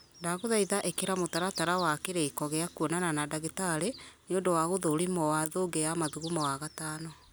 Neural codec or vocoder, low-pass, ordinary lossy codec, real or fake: none; none; none; real